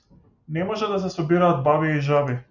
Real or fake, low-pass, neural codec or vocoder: real; 7.2 kHz; none